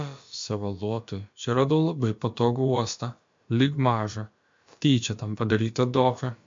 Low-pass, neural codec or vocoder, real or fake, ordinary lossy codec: 7.2 kHz; codec, 16 kHz, about 1 kbps, DyCAST, with the encoder's durations; fake; MP3, 48 kbps